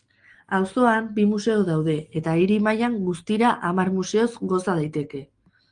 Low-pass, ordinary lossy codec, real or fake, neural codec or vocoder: 9.9 kHz; Opus, 24 kbps; real; none